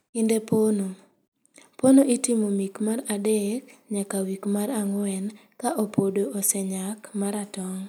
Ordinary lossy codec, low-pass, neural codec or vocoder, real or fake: none; none; none; real